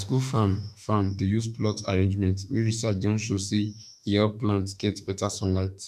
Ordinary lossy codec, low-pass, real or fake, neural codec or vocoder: none; 14.4 kHz; fake; autoencoder, 48 kHz, 32 numbers a frame, DAC-VAE, trained on Japanese speech